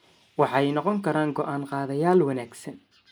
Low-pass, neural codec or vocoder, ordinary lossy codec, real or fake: none; none; none; real